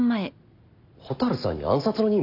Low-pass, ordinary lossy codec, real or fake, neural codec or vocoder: 5.4 kHz; none; real; none